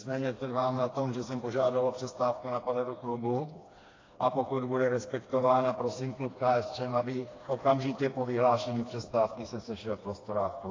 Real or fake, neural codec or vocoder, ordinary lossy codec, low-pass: fake; codec, 16 kHz, 2 kbps, FreqCodec, smaller model; AAC, 32 kbps; 7.2 kHz